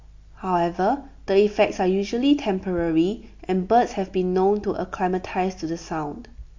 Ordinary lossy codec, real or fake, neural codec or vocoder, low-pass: MP3, 48 kbps; real; none; 7.2 kHz